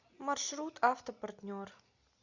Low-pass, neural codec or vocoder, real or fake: 7.2 kHz; none; real